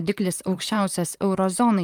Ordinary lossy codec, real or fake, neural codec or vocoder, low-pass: Opus, 32 kbps; fake; vocoder, 44.1 kHz, 128 mel bands, Pupu-Vocoder; 19.8 kHz